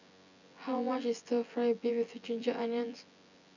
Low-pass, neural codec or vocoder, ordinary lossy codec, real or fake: 7.2 kHz; vocoder, 24 kHz, 100 mel bands, Vocos; none; fake